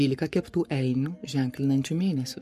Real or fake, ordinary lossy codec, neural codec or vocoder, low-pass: fake; MP3, 64 kbps; codec, 44.1 kHz, 7.8 kbps, Pupu-Codec; 14.4 kHz